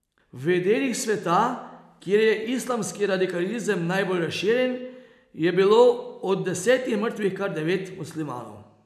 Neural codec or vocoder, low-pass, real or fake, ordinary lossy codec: none; 14.4 kHz; real; none